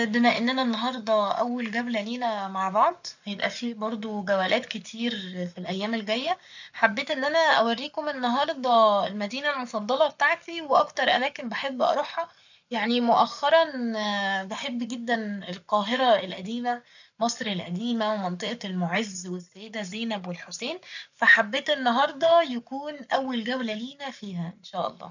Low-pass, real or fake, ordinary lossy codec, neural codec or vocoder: 7.2 kHz; fake; none; codec, 44.1 kHz, 7.8 kbps, Pupu-Codec